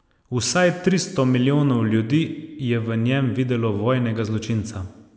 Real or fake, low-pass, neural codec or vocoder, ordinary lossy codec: real; none; none; none